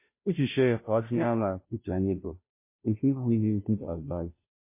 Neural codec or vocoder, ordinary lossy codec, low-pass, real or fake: codec, 16 kHz, 0.5 kbps, FunCodec, trained on Chinese and English, 25 frames a second; MP3, 24 kbps; 3.6 kHz; fake